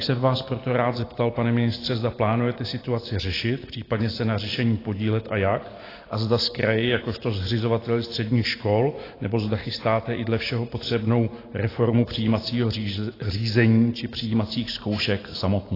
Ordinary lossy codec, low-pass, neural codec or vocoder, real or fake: AAC, 24 kbps; 5.4 kHz; none; real